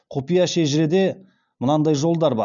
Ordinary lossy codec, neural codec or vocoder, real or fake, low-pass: none; none; real; 7.2 kHz